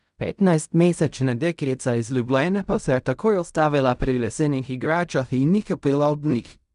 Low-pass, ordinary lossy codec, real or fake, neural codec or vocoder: 10.8 kHz; none; fake; codec, 16 kHz in and 24 kHz out, 0.4 kbps, LongCat-Audio-Codec, fine tuned four codebook decoder